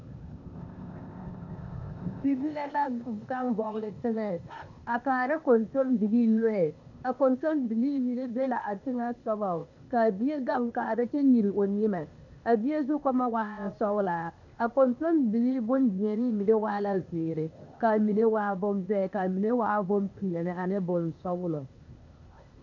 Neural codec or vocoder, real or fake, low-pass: codec, 16 kHz, 0.8 kbps, ZipCodec; fake; 7.2 kHz